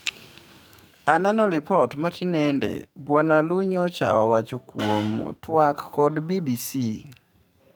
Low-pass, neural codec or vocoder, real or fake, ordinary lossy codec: none; codec, 44.1 kHz, 2.6 kbps, SNAC; fake; none